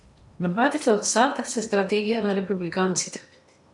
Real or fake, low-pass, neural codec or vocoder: fake; 10.8 kHz; codec, 16 kHz in and 24 kHz out, 0.8 kbps, FocalCodec, streaming, 65536 codes